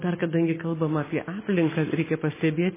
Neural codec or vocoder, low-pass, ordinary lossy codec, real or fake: none; 3.6 kHz; MP3, 16 kbps; real